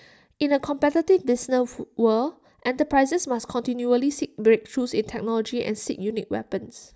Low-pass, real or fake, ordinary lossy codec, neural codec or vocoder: none; real; none; none